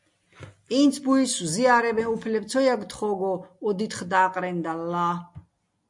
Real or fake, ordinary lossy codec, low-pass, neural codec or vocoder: real; MP3, 64 kbps; 10.8 kHz; none